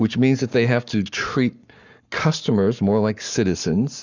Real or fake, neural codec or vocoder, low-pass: fake; codec, 44.1 kHz, 7.8 kbps, Pupu-Codec; 7.2 kHz